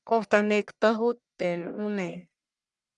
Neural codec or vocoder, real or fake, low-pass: codec, 44.1 kHz, 1.7 kbps, Pupu-Codec; fake; 10.8 kHz